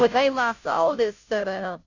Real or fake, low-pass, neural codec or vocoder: fake; 7.2 kHz; codec, 16 kHz, 0.5 kbps, FunCodec, trained on Chinese and English, 25 frames a second